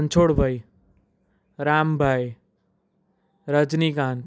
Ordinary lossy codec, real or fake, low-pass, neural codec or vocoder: none; real; none; none